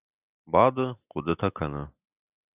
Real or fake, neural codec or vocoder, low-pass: real; none; 3.6 kHz